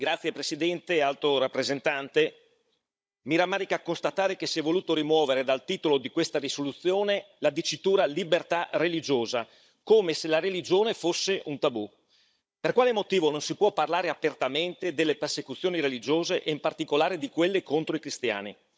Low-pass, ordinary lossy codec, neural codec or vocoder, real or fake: none; none; codec, 16 kHz, 16 kbps, FunCodec, trained on Chinese and English, 50 frames a second; fake